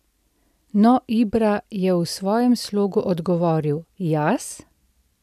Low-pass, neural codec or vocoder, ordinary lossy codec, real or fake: 14.4 kHz; none; none; real